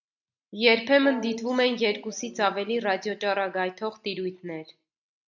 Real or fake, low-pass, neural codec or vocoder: real; 7.2 kHz; none